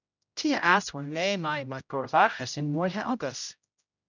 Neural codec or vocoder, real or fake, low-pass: codec, 16 kHz, 0.5 kbps, X-Codec, HuBERT features, trained on general audio; fake; 7.2 kHz